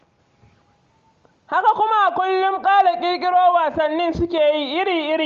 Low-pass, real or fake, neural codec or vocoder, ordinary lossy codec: 7.2 kHz; real; none; Opus, 32 kbps